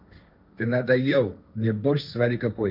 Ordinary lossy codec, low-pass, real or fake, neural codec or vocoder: none; 5.4 kHz; fake; codec, 16 kHz, 1.1 kbps, Voila-Tokenizer